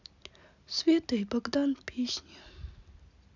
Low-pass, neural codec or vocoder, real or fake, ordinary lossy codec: 7.2 kHz; none; real; none